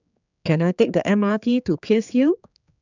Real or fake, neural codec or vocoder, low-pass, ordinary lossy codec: fake; codec, 16 kHz, 4 kbps, X-Codec, HuBERT features, trained on general audio; 7.2 kHz; none